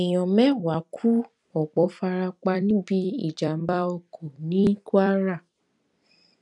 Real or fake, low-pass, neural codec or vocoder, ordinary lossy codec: fake; 10.8 kHz; vocoder, 44.1 kHz, 128 mel bands every 256 samples, BigVGAN v2; none